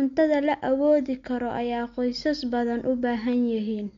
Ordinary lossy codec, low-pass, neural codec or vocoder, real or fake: MP3, 48 kbps; 7.2 kHz; none; real